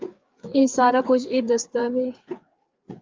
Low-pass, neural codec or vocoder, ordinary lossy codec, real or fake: 7.2 kHz; codec, 16 kHz, 4 kbps, FreqCodec, larger model; Opus, 32 kbps; fake